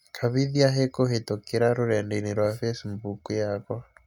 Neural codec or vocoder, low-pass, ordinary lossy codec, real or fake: none; 19.8 kHz; none; real